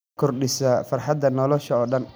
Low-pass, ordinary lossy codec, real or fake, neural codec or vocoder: none; none; real; none